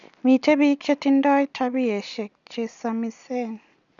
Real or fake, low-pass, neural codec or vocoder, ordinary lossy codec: real; 7.2 kHz; none; none